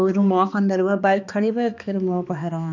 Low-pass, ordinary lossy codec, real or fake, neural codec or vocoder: 7.2 kHz; none; fake; codec, 16 kHz, 2 kbps, X-Codec, HuBERT features, trained on balanced general audio